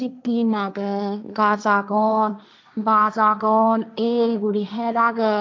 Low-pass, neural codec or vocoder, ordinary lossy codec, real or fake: none; codec, 16 kHz, 1.1 kbps, Voila-Tokenizer; none; fake